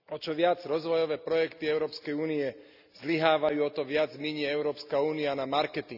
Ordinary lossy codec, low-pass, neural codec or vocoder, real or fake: none; 5.4 kHz; none; real